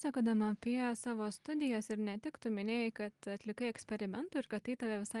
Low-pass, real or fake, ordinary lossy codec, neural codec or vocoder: 10.8 kHz; real; Opus, 24 kbps; none